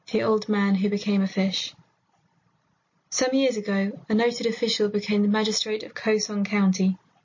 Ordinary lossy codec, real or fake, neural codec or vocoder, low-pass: MP3, 32 kbps; real; none; 7.2 kHz